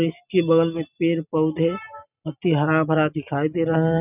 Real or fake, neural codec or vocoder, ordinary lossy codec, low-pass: real; none; none; 3.6 kHz